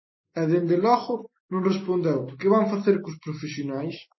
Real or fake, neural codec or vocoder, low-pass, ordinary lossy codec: real; none; 7.2 kHz; MP3, 24 kbps